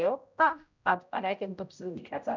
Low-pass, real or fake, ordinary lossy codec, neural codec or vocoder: 7.2 kHz; fake; none; codec, 16 kHz, 0.5 kbps, X-Codec, HuBERT features, trained on general audio